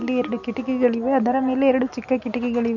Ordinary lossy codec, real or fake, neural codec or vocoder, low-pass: none; real; none; 7.2 kHz